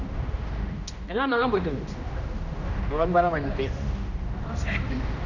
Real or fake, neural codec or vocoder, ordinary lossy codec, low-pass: fake; codec, 16 kHz, 1 kbps, X-Codec, HuBERT features, trained on general audio; none; 7.2 kHz